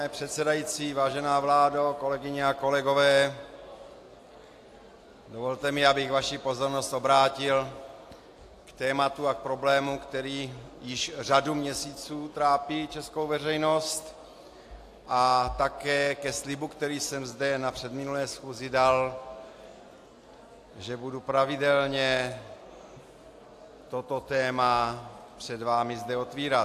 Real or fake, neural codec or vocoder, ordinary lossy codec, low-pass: real; none; AAC, 64 kbps; 14.4 kHz